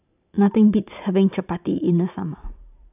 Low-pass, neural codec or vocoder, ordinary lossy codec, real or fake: 3.6 kHz; vocoder, 44.1 kHz, 128 mel bands every 512 samples, BigVGAN v2; none; fake